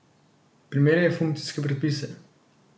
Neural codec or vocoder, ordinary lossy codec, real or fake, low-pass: none; none; real; none